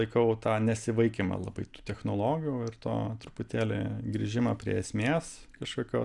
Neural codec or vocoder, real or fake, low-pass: none; real; 10.8 kHz